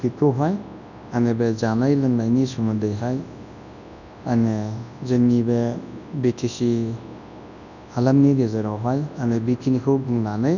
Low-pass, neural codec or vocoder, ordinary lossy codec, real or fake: 7.2 kHz; codec, 24 kHz, 0.9 kbps, WavTokenizer, large speech release; none; fake